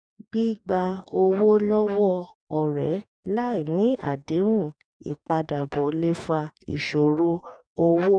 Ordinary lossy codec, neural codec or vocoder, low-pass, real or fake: none; codec, 44.1 kHz, 2.6 kbps, DAC; 9.9 kHz; fake